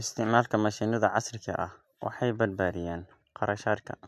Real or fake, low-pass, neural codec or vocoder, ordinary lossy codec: real; 14.4 kHz; none; none